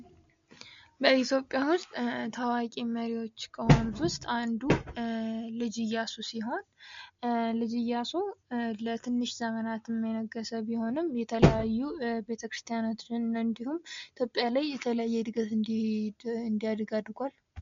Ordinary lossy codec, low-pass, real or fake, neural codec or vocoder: MP3, 48 kbps; 7.2 kHz; real; none